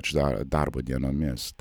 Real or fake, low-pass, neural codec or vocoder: real; 19.8 kHz; none